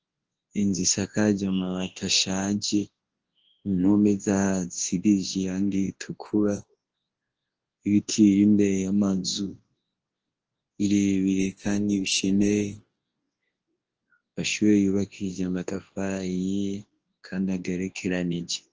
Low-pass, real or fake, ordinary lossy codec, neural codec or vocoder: 7.2 kHz; fake; Opus, 16 kbps; codec, 24 kHz, 0.9 kbps, WavTokenizer, large speech release